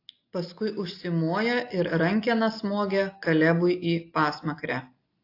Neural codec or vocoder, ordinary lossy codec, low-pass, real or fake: none; AAC, 32 kbps; 5.4 kHz; real